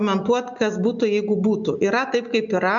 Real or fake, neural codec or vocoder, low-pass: real; none; 7.2 kHz